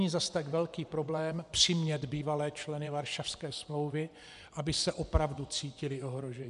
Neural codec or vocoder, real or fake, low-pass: vocoder, 24 kHz, 100 mel bands, Vocos; fake; 10.8 kHz